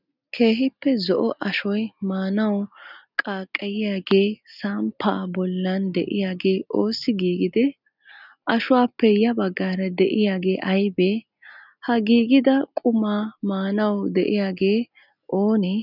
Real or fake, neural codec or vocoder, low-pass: real; none; 5.4 kHz